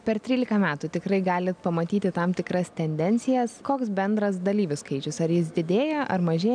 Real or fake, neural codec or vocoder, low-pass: real; none; 9.9 kHz